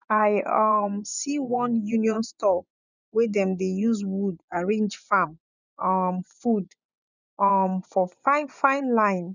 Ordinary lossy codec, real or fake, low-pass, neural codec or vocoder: none; fake; 7.2 kHz; vocoder, 24 kHz, 100 mel bands, Vocos